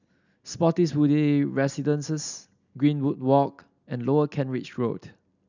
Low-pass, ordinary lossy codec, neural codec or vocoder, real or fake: 7.2 kHz; none; none; real